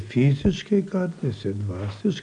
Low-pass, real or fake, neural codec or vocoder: 9.9 kHz; real; none